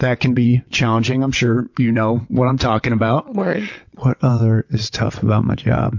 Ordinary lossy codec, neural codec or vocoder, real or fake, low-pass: MP3, 48 kbps; vocoder, 22.05 kHz, 80 mel bands, WaveNeXt; fake; 7.2 kHz